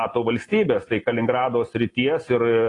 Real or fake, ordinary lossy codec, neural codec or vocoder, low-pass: real; AAC, 32 kbps; none; 10.8 kHz